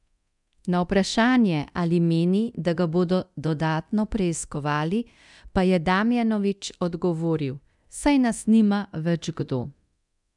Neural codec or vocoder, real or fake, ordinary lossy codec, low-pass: codec, 24 kHz, 0.9 kbps, DualCodec; fake; none; 10.8 kHz